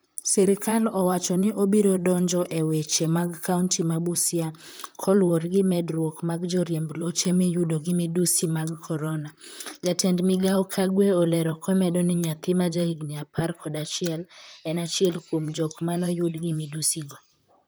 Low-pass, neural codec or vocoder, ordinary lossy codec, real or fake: none; vocoder, 44.1 kHz, 128 mel bands, Pupu-Vocoder; none; fake